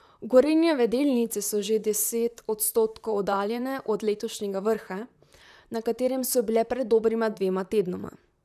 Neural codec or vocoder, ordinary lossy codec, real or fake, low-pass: vocoder, 44.1 kHz, 128 mel bands, Pupu-Vocoder; none; fake; 14.4 kHz